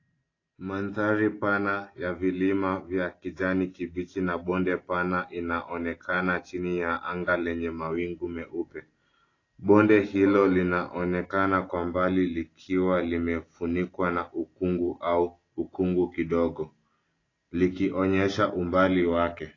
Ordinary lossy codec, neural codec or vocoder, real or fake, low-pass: AAC, 32 kbps; none; real; 7.2 kHz